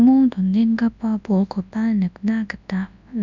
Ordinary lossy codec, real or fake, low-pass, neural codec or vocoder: none; fake; 7.2 kHz; codec, 24 kHz, 0.9 kbps, WavTokenizer, large speech release